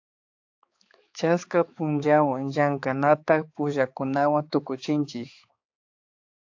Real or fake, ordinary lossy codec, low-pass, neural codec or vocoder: fake; AAC, 48 kbps; 7.2 kHz; codec, 16 kHz, 4 kbps, X-Codec, HuBERT features, trained on balanced general audio